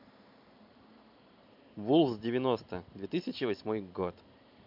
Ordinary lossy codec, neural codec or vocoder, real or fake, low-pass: MP3, 48 kbps; vocoder, 44.1 kHz, 128 mel bands every 512 samples, BigVGAN v2; fake; 5.4 kHz